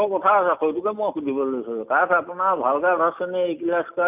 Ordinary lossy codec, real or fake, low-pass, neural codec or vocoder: none; real; 3.6 kHz; none